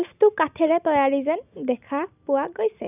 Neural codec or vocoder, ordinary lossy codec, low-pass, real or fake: none; none; 3.6 kHz; real